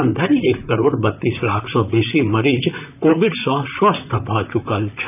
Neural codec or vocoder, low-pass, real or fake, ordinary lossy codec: vocoder, 44.1 kHz, 128 mel bands, Pupu-Vocoder; 3.6 kHz; fake; none